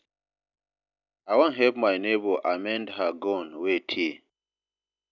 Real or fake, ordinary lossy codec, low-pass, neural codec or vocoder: real; none; 7.2 kHz; none